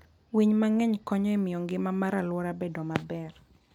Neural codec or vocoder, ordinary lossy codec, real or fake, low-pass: none; none; real; 19.8 kHz